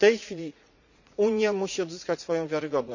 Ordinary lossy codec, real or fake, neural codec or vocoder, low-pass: none; fake; vocoder, 44.1 kHz, 80 mel bands, Vocos; 7.2 kHz